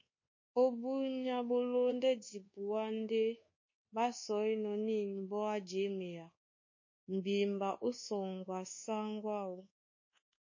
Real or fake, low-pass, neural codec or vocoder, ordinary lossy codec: fake; 7.2 kHz; codec, 24 kHz, 1.2 kbps, DualCodec; MP3, 32 kbps